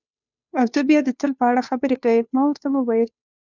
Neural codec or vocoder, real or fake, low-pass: codec, 16 kHz, 2 kbps, FunCodec, trained on Chinese and English, 25 frames a second; fake; 7.2 kHz